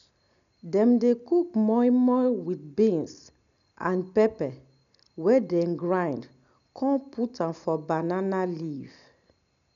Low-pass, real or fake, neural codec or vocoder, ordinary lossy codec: 7.2 kHz; real; none; none